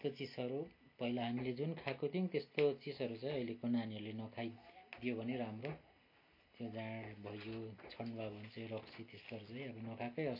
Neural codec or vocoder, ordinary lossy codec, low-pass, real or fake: none; MP3, 32 kbps; 5.4 kHz; real